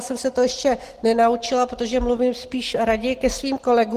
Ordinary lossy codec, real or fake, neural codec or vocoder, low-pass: Opus, 16 kbps; real; none; 14.4 kHz